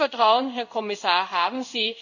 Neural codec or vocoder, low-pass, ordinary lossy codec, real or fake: codec, 16 kHz in and 24 kHz out, 1 kbps, XY-Tokenizer; 7.2 kHz; none; fake